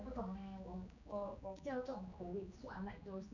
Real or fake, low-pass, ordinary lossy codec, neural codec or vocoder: fake; 7.2 kHz; none; codec, 16 kHz, 2 kbps, X-Codec, HuBERT features, trained on general audio